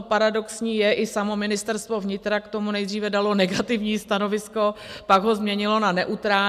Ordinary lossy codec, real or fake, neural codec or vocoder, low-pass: MP3, 96 kbps; real; none; 14.4 kHz